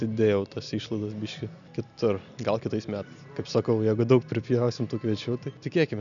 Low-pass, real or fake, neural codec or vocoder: 7.2 kHz; real; none